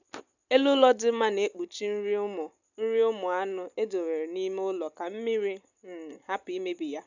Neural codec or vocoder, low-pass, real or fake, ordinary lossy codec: none; 7.2 kHz; real; none